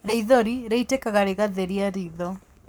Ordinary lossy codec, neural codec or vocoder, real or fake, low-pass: none; codec, 44.1 kHz, 7.8 kbps, Pupu-Codec; fake; none